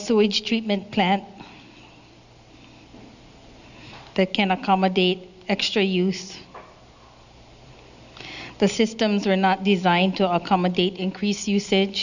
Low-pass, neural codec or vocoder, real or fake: 7.2 kHz; none; real